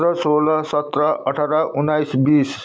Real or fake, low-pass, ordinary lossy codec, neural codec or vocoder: real; none; none; none